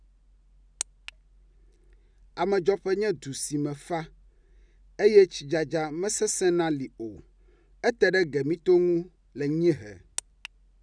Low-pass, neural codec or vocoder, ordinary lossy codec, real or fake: 9.9 kHz; none; none; real